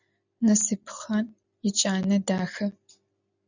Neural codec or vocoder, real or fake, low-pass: none; real; 7.2 kHz